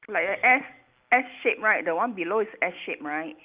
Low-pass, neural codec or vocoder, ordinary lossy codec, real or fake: 3.6 kHz; none; Opus, 24 kbps; real